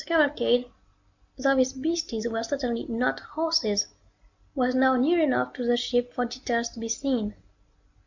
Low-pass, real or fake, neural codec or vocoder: 7.2 kHz; real; none